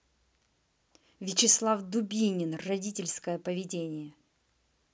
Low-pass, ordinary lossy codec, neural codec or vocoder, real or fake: none; none; none; real